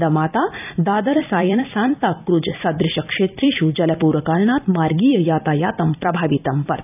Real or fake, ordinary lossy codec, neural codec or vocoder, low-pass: fake; none; vocoder, 44.1 kHz, 128 mel bands every 256 samples, BigVGAN v2; 3.6 kHz